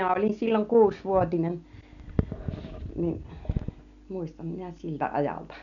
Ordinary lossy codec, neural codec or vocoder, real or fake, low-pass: none; none; real; 7.2 kHz